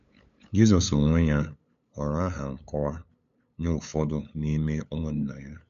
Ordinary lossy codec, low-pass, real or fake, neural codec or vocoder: AAC, 96 kbps; 7.2 kHz; fake; codec, 16 kHz, 8 kbps, FunCodec, trained on LibriTTS, 25 frames a second